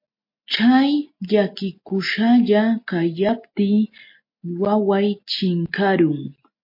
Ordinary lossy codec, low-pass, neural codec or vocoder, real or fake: MP3, 32 kbps; 5.4 kHz; none; real